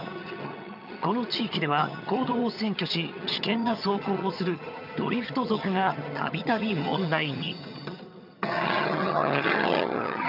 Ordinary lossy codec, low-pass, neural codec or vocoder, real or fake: none; 5.4 kHz; vocoder, 22.05 kHz, 80 mel bands, HiFi-GAN; fake